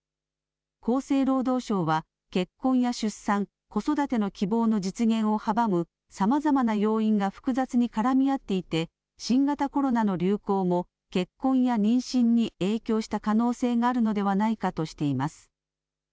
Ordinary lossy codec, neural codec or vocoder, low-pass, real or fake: none; none; none; real